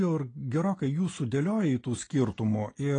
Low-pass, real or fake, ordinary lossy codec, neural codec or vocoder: 9.9 kHz; real; AAC, 32 kbps; none